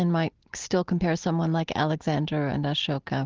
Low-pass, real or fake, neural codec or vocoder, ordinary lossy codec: 7.2 kHz; real; none; Opus, 16 kbps